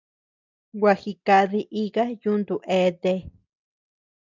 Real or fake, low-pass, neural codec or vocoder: real; 7.2 kHz; none